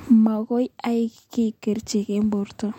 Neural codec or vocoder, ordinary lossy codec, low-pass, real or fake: none; MP3, 64 kbps; 19.8 kHz; real